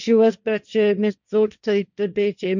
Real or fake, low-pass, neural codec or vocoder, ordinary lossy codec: fake; 7.2 kHz; codec, 16 kHz, 1.1 kbps, Voila-Tokenizer; none